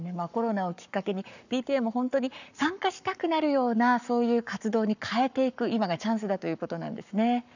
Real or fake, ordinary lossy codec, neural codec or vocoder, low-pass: fake; none; codec, 44.1 kHz, 7.8 kbps, Pupu-Codec; 7.2 kHz